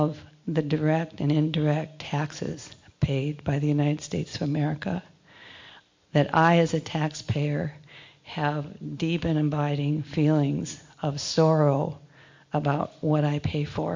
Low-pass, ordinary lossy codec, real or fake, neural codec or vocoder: 7.2 kHz; MP3, 48 kbps; real; none